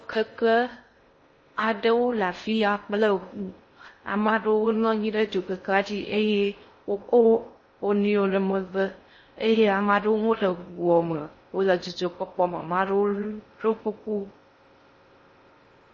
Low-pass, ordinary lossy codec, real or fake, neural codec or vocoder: 9.9 kHz; MP3, 32 kbps; fake; codec, 16 kHz in and 24 kHz out, 0.6 kbps, FocalCodec, streaming, 2048 codes